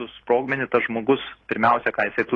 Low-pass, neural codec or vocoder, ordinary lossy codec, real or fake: 10.8 kHz; none; AAC, 32 kbps; real